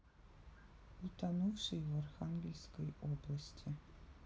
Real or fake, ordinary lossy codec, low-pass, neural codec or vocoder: real; none; none; none